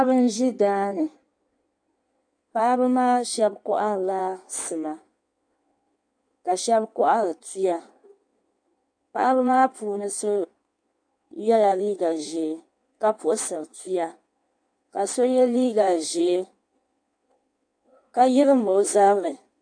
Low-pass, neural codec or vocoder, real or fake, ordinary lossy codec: 9.9 kHz; codec, 16 kHz in and 24 kHz out, 1.1 kbps, FireRedTTS-2 codec; fake; AAC, 64 kbps